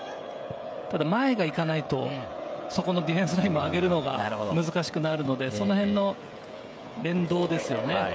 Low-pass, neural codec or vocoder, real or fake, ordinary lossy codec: none; codec, 16 kHz, 16 kbps, FreqCodec, smaller model; fake; none